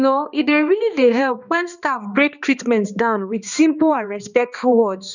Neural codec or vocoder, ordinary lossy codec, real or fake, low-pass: codec, 16 kHz, 2 kbps, X-Codec, HuBERT features, trained on balanced general audio; none; fake; 7.2 kHz